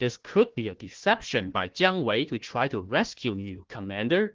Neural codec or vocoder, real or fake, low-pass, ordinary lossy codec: codec, 16 kHz, 1 kbps, FunCodec, trained on Chinese and English, 50 frames a second; fake; 7.2 kHz; Opus, 16 kbps